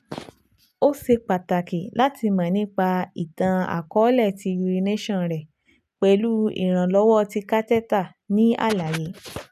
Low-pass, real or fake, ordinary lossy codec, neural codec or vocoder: 14.4 kHz; real; none; none